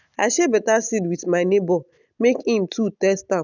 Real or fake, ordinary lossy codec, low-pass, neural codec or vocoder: real; none; 7.2 kHz; none